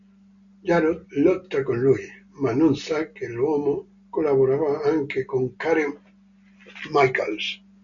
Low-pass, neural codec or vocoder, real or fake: 7.2 kHz; none; real